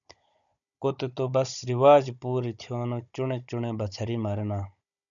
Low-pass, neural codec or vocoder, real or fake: 7.2 kHz; codec, 16 kHz, 16 kbps, FunCodec, trained on Chinese and English, 50 frames a second; fake